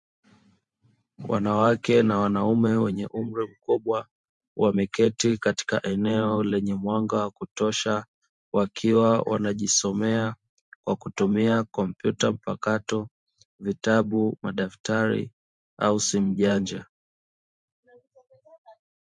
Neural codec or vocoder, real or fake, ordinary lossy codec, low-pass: vocoder, 44.1 kHz, 128 mel bands every 512 samples, BigVGAN v2; fake; MP3, 64 kbps; 10.8 kHz